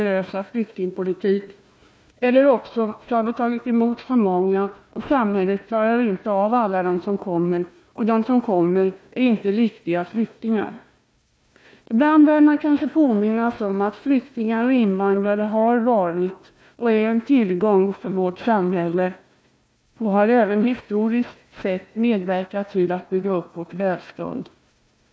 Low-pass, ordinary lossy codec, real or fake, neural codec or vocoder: none; none; fake; codec, 16 kHz, 1 kbps, FunCodec, trained on Chinese and English, 50 frames a second